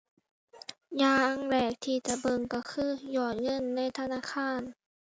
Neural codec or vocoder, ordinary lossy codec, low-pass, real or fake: none; none; none; real